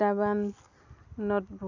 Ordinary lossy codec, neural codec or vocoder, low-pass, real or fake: none; none; 7.2 kHz; real